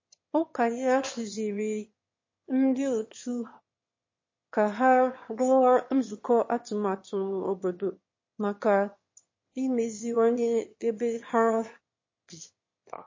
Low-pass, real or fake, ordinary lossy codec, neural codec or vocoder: 7.2 kHz; fake; MP3, 32 kbps; autoencoder, 22.05 kHz, a latent of 192 numbers a frame, VITS, trained on one speaker